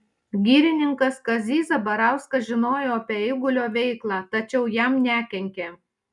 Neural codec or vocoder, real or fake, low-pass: none; real; 10.8 kHz